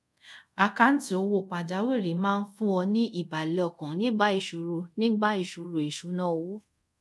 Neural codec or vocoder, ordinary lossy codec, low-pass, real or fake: codec, 24 kHz, 0.5 kbps, DualCodec; none; none; fake